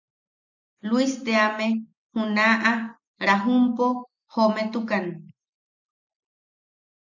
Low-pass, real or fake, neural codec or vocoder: 7.2 kHz; real; none